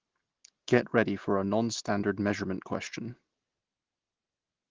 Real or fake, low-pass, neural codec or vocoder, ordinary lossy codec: real; 7.2 kHz; none; Opus, 16 kbps